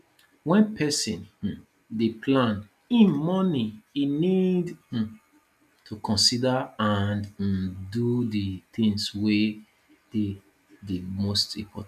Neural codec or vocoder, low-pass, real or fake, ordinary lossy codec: none; 14.4 kHz; real; none